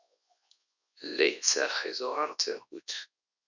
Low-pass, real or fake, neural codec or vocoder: 7.2 kHz; fake; codec, 24 kHz, 0.9 kbps, WavTokenizer, large speech release